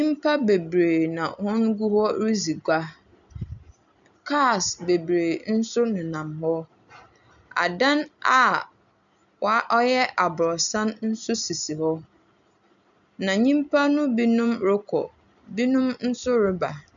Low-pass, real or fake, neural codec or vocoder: 7.2 kHz; real; none